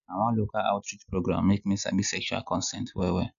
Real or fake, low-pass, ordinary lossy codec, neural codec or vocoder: real; 7.2 kHz; none; none